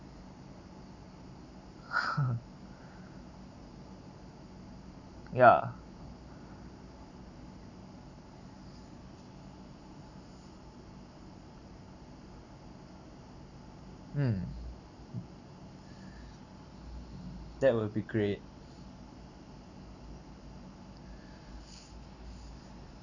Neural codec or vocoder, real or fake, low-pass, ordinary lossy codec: none; real; 7.2 kHz; none